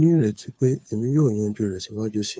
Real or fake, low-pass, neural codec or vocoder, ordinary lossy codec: fake; none; codec, 16 kHz, 2 kbps, FunCodec, trained on Chinese and English, 25 frames a second; none